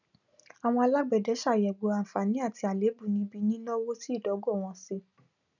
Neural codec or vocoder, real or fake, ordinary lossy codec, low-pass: none; real; none; 7.2 kHz